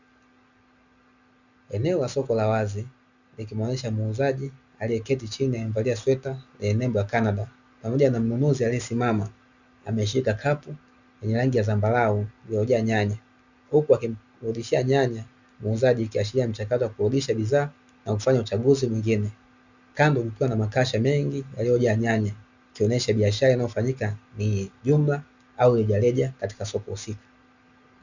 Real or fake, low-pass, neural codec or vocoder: real; 7.2 kHz; none